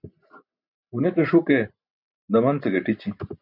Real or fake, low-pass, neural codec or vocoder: real; 5.4 kHz; none